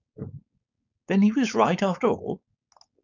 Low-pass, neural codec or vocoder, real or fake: 7.2 kHz; codec, 16 kHz, 4.8 kbps, FACodec; fake